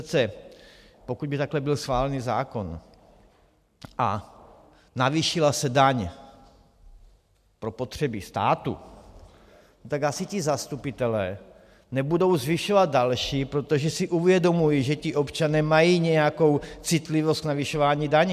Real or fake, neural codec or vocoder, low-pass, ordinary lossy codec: real; none; 14.4 kHz; MP3, 96 kbps